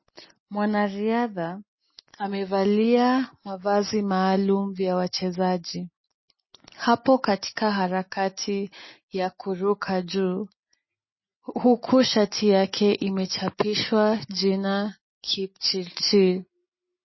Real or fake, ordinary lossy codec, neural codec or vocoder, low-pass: real; MP3, 24 kbps; none; 7.2 kHz